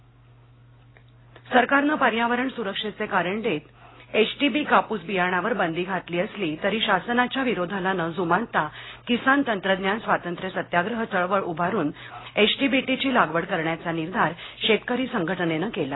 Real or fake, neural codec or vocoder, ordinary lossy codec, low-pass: real; none; AAC, 16 kbps; 7.2 kHz